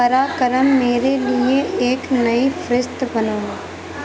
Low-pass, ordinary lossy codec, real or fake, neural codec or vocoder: none; none; real; none